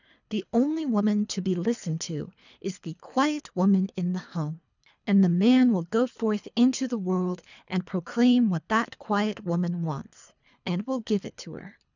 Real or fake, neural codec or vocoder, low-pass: fake; codec, 24 kHz, 3 kbps, HILCodec; 7.2 kHz